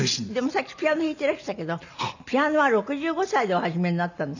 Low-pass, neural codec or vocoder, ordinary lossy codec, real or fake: 7.2 kHz; none; none; real